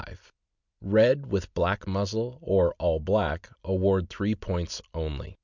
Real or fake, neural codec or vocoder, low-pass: real; none; 7.2 kHz